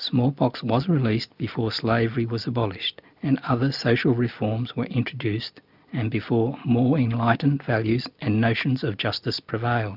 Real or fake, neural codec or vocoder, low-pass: real; none; 5.4 kHz